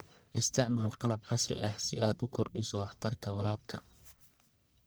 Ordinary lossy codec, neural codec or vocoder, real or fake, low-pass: none; codec, 44.1 kHz, 1.7 kbps, Pupu-Codec; fake; none